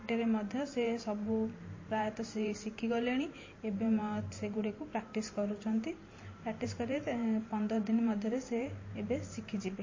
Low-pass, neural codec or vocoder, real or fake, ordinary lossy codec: 7.2 kHz; vocoder, 44.1 kHz, 128 mel bands every 512 samples, BigVGAN v2; fake; MP3, 32 kbps